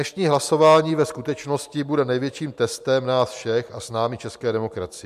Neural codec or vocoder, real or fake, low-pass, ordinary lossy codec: none; real; 14.4 kHz; MP3, 96 kbps